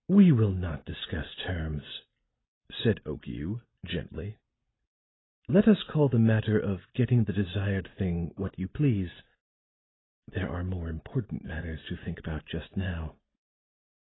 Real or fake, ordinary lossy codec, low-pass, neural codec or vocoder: real; AAC, 16 kbps; 7.2 kHz; none